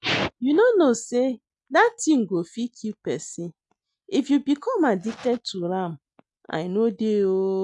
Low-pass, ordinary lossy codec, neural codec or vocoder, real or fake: 10.8 kHz; none; none; real